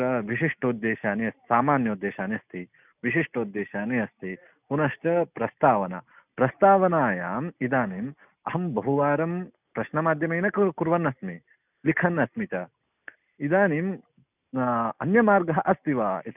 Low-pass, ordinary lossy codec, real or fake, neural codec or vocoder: 3.6 kHz; none; real; none